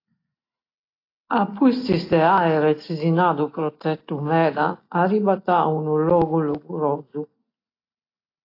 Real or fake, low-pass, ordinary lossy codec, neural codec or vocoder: real; 5.4 kHz; AAC, 32 kbps; none